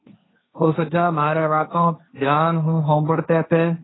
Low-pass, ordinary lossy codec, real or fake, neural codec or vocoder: 7.2 kHz; AAC, 16 kbps; fake; codec, 16 kHz, 1.1 kbps, Voila-Tokenizer